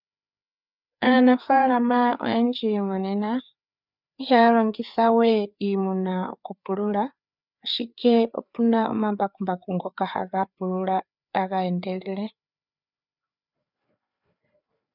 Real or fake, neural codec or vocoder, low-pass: fake; codec, 16 kHz, 4 kbps, FreqCodec, larger model; 5.4 kHz